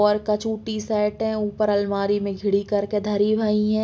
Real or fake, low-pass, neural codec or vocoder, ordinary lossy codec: real; none; none; none